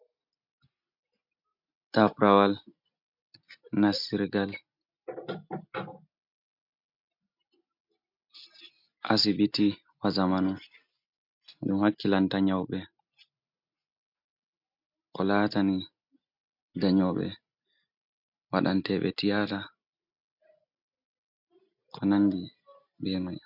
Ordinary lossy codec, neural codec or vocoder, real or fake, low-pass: MP3, 48 kbps; none; real; 5.4 kHz